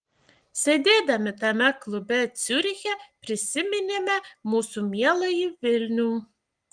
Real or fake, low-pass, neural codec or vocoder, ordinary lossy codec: real; 9.9 kHz; none; Opus, 24 kbps